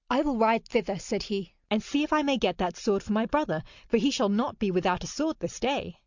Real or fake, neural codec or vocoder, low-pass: real; none; 7.2 kHz